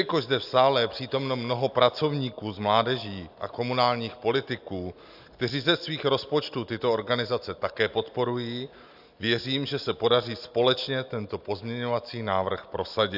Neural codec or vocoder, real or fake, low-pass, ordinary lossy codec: none; real; 5.4 kHz; AAC, 48 kbps